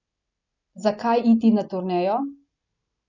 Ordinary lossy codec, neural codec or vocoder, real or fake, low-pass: none; none; real; 7.2 kHz